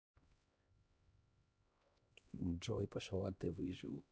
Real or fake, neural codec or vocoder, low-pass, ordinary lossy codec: fake; codec, 16 kHz, 1 kbps, X-Codec, HuBERT features, trained on LibriSpeech; none; none